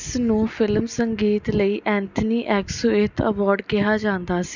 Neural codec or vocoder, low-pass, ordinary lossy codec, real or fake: none; 7.2 kHz; none; real